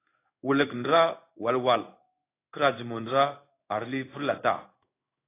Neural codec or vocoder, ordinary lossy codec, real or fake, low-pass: codec, 16 kHz in and 24 kHz out, 1 kbps, XY-Tokenizer; AAC, 24 kbps; fake; 3.6 kHz